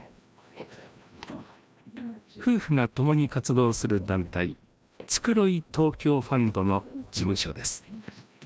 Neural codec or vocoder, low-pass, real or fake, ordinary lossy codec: codec, 16 kHz, 1 kbps, FreqCodec, larger model; none; fake; none